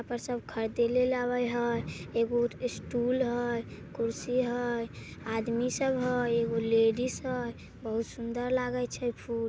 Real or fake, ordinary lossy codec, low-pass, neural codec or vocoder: real; none; none; none